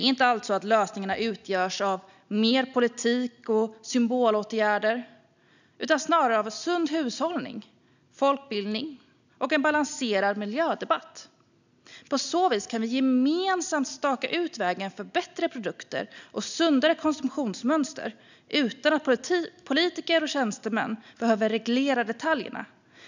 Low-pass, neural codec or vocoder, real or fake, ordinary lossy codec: 7.2 kHz; none; real; none